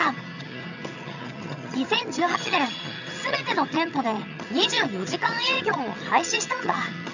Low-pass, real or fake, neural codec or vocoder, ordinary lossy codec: 7.2 kHz; fake; vocoder, 22.05 kHz, 80 mel bands, HiFi-GAN; none